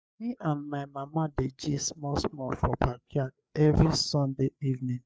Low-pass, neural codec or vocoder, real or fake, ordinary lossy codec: none; codec, 16 kHz, 4 kbps, FreqCodec, larger model; fake; none